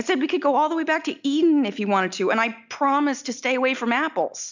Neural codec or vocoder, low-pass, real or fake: none; 7.2 kHz; real